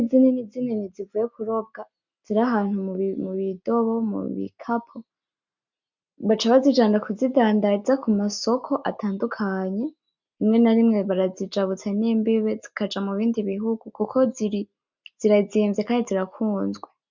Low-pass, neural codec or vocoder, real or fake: 7.2 kHz; none; real